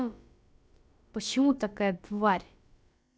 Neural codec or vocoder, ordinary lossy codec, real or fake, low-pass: codec, 16 kHz, about 1 kbps, DyCAST, with the encoder's durations; none; fake; none